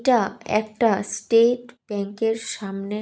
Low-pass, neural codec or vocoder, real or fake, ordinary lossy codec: none; none; real; none